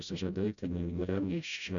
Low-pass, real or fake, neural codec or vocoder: 7.2 kHz; fake; codec, 16 kHz, 0.5 kbps, FreqCodec, smaller model